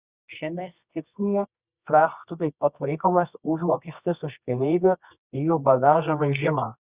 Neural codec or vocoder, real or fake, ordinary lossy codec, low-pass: codec, 24 kHz, 0.9 kbps, WavTokenizer, medium music audio release; fake; Opus, 64 kbps; 3.6 kHz